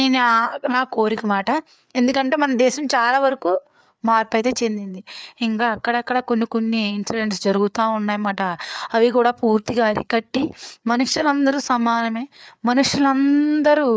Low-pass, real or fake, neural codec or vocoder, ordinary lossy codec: none; fake; codec, 16 kHz, 4 kbps, FreqCodec, larger model; none